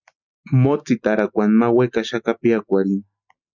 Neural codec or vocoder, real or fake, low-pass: none; real; 7.2 kHz